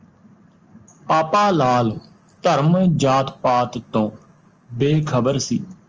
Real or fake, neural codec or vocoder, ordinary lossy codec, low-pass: real; none; Opus, 24 kbps; 7.2 kHz